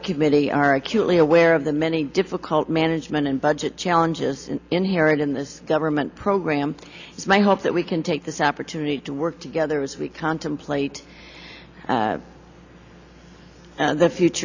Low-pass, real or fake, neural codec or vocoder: 7.2 kHz; real; none